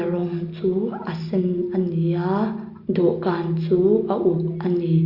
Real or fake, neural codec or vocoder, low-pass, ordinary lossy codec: real; none; 5.4 kHz; Opus, 64 kbps